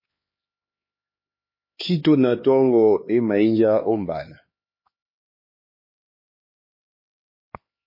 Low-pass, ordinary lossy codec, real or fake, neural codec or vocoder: 5.4 kHz; MP3, 24 kbps; fake; codec, 16 kHz, 2 kbps, X-Codec, HuBERT features, trained on LibriSpeech